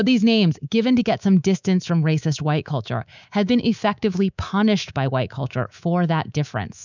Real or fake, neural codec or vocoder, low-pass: fake; codec, 24 kHz, 3.1 kbps, DualCodec; 7.2 kHz